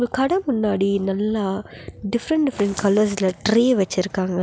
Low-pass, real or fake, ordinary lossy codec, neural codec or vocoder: none; real; none; none